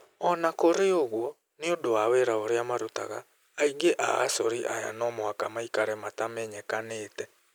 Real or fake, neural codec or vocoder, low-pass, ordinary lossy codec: real; none; none; none